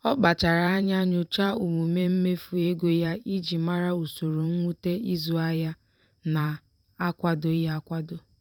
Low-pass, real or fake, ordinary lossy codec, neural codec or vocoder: 19.8 kHz; fake; none; vocoder, 44.1 kHz, 128 mel bands every 512 samples, BigVGAN v2